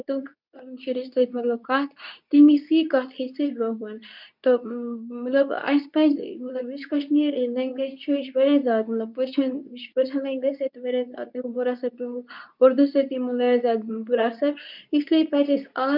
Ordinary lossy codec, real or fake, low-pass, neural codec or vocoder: none; fake; 5.4 kHz; codec, 24 kHz, 0.9 kbps, WavTokenizer, medium speech release version 2